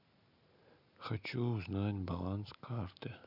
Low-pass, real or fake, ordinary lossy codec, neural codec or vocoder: 5.4 kHz; real; none; none